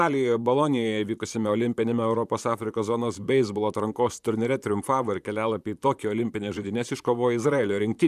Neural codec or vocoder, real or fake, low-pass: vocoder, 44.1 kHz, 128 mel bands, Pupu-Vocoder; fake; 14.4 kHz